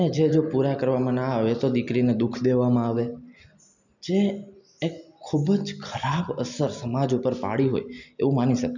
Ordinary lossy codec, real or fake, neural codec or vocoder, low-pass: none; real; none; 7.2 kHz